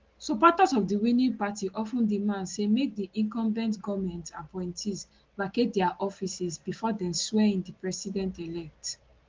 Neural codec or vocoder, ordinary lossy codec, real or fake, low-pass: none; Opus, 16 kbps; real; 7.2 kHz